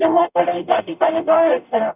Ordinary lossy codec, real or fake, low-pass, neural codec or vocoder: none; fake; 3.6 kHz; codec, 44.1 kHz, 0.9 kbps, DAC